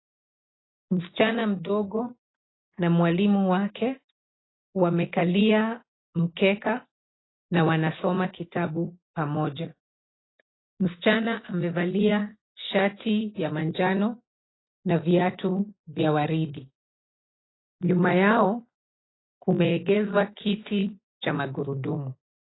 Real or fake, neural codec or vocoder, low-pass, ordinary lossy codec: real; none; 7.2 kHz; AAC, 16 kbps